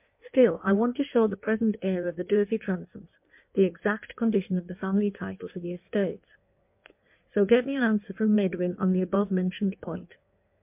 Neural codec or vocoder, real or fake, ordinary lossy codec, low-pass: codec, 16 kHz in and 24 kHz out, 1.1 kbps, FireRedTTS-2 codec; fake; MP3, 32 kbps; 3.6 kHz